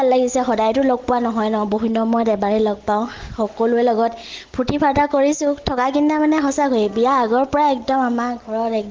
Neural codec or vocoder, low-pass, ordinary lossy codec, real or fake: none; 7.2 kHz; Opus, 16 kbps; real